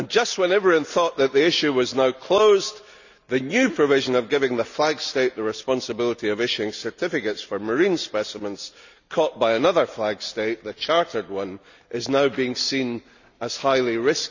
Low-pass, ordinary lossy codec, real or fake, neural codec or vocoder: 7.2 kHz; none; real; none